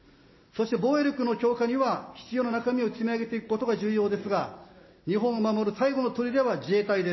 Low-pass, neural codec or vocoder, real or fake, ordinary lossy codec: 7.2 kHz; none; real; MP3, 24 kbps